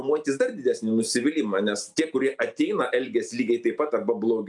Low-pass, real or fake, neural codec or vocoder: 10.8 kHz; real; none